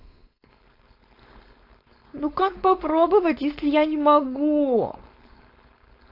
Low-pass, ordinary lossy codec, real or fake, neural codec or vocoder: 5.4 kHz; AAC, 32 kbps; fake; codec, 16 kHz, 4.8 kbps, FACodec